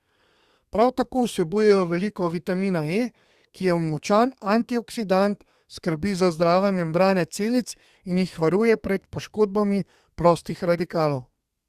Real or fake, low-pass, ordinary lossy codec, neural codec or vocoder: fake; 14.4 kHz; Opus, 64 kbps; codec, 32 kHz, 1.9 kbps, SNAC